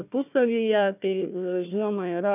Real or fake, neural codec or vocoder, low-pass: fake; codec, 16 kHz, 1 kbps, FunCodec, trained on Chinese and English, 50 frames a second; 3.6 kHz